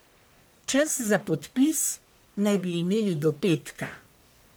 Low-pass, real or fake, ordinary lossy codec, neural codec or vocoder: none; fake; none; codec, 44.1 kHz, 1.7 kbps, Pupu-Codec